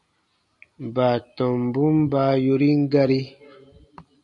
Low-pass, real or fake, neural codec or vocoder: 10.8 kHz; real; none